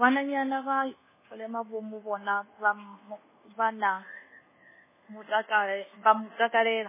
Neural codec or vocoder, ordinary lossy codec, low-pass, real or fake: codec, 24 kHz, 1.2 kbps, DualCodec; MP3, 16 kbps; 3.6 kHz; fake